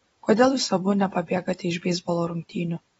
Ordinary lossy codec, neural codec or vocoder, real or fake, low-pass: AAC, 24 kbps; vocoder, 44.1 kHz, 128 mel bands every 256 samples, BigVGAN v2; fake; 19.8 kHz